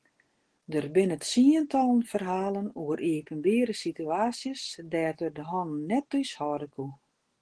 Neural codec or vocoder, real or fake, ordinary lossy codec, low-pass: none; real; Opus, 16 kbps; 10.8 kHz